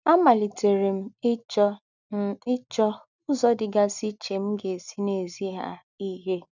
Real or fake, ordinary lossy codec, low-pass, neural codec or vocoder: real; none; 7.2 kHz; none